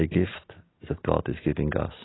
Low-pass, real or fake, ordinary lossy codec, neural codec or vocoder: 7.2 kHz; real; AAC, 16 kbps; none